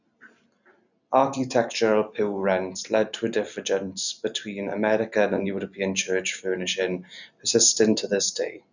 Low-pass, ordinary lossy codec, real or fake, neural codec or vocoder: 7.2 kHz; none; real; none